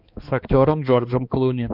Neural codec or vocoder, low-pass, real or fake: codec, 16 kHz, 2 kbps, X-Codec, HuBERT features, trained on balanced general audio; 5.4 kHz; fake